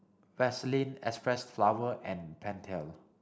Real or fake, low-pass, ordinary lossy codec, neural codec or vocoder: real; none; none; none